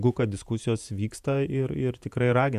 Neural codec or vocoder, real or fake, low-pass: vocoder, 48 kHz, 128 mel bands, Vocos; fake; 14.4 kHz